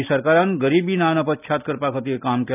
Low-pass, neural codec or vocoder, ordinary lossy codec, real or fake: 3.6 kHz; none; none; real